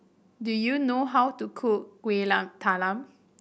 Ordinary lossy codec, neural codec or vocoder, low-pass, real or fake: none; none; none; real